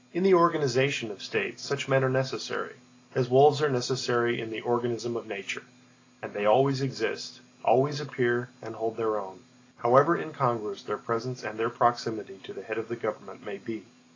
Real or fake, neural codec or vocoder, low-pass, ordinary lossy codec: real; none; 7.2 kHz; AAC, 32 kbps